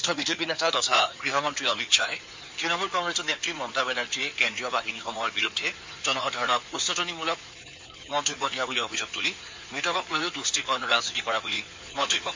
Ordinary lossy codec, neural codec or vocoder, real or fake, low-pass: MP3, 64 kbps; codec, 16 kHz in and 24 kHz out, 2.2 kbps, FireRedTTS-2 codec; fake; 7.2 kHz